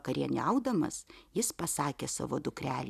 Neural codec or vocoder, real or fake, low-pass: none; real; 14.4 kHz